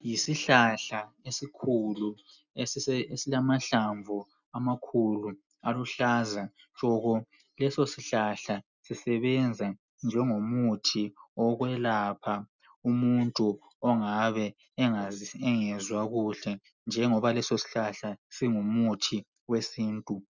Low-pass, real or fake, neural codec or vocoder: 7.2 kHz; real; none